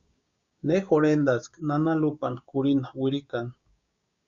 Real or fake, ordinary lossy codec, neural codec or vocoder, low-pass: fake; Opus, 64 kbps; codec, 16 kHz, 6 kbps, DAC; 7.2 kHz